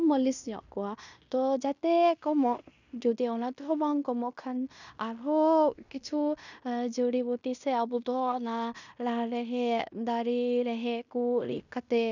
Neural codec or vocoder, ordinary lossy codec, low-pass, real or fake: codec, 16 kHz in and 24 kHz out, 0.9 kbps, LongCat-Audio-Codec, fine tuned four codebook decoder; none; 7.2 kHz; fake